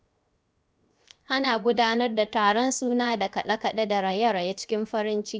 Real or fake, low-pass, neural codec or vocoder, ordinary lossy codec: fake; none; codec, 16 kHz, 0.7 kbps, FocalCodec; none